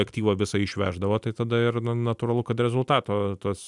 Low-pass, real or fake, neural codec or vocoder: 10.8 kHz; real; none